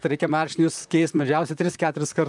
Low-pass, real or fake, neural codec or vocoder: 10.8 kHz; fake; vocoder, 44.1 kHz, 128 mel bands, Pupu-Vocoder